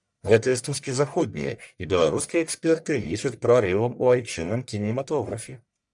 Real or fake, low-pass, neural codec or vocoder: fake; 10.8 kHz; codec, 44.1 kHz, 1.7 kbps, Pupu-Codec